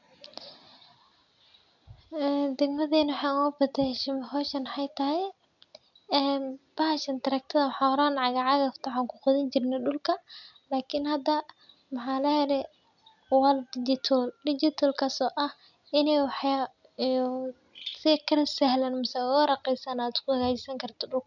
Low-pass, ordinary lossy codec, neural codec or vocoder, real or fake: 7.2 kHz; none; none; real